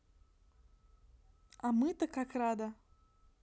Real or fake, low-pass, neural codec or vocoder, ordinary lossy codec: real; none; none; none